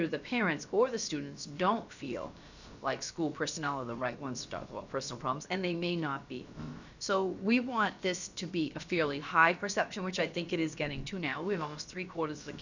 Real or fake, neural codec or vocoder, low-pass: fake; codec, 16 kHz, about 1 kbps, DyCAST, with the encoder's durations; 7.2 kHz